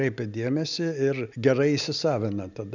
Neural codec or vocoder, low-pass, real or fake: none; 7.2 kHz; real